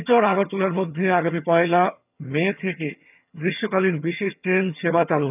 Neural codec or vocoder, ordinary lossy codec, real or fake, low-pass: vocoder, 22.05 kHz, 80 mel bands, HiFi-GAN; none; fake; 3.6 kHz